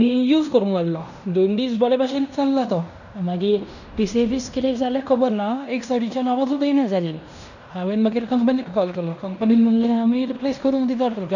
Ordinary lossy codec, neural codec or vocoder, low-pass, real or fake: none; codec, 16 kHz in and 24 kHz out, 0.9 kbps, LongCat-Audio-Codec, fine tuned four codebook decoder; 7.2 kHz; fake